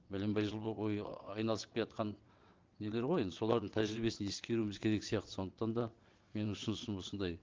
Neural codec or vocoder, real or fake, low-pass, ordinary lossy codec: vocoder, 22.05 kHz, 80 mel bands, WaveNeXt; fake; 7.2 kHz; Opus, 32 kbps